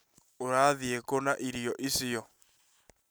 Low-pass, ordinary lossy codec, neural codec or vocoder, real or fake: none; none; none; real